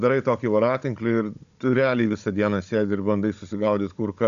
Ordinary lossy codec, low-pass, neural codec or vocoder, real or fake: MP3, 96 kbps; 7.2 kHz; codec, 16 kHz, 16 kbps, FunCodec, trained on Chinese and English, 50 frames a second; fake